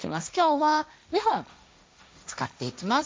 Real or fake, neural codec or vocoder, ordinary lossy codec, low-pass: fake; codec, 16 kHz, 1.1 kbps, Voila-Tokenizer; none; none